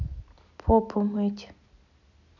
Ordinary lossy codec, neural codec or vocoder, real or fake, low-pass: none; none; real; 7.2 kHz